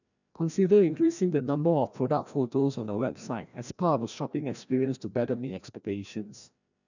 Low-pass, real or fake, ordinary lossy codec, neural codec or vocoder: 7.2 kHz; fake; none; codec, 16 kHz, 1 kbps, FreqCodec, larger model